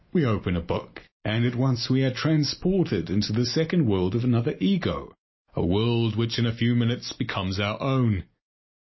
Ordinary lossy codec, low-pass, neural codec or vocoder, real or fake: MP3, 24 kbps; 7.2 kHz; none; real